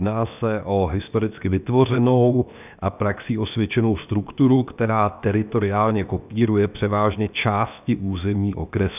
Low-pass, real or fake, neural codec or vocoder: 3.6 kHz; fake; codec, 16 kHz, 0.7 kbps, FocalCodec